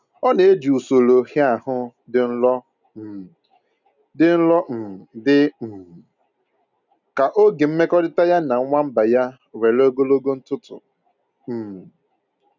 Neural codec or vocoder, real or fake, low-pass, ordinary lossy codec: none; real; 7.2 kHz; none